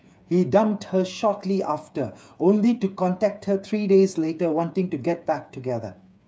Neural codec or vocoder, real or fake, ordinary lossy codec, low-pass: codec, 16 kHz, 8 kbps, FreqCodec, smaller model; fake; none; none